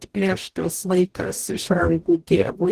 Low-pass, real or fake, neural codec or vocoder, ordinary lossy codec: 14.4 kHz; fake; codec, 44.1 kHz, 0.9 kbps, DAC; Opus, 16 kbps